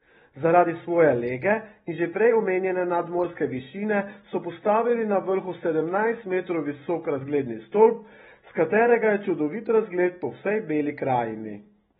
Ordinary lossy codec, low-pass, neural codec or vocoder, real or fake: AAC, 16 kbps; 7.2 kHz; none; real